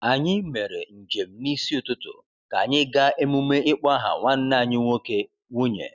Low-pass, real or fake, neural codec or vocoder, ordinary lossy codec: 7.2 kHz; real; none; none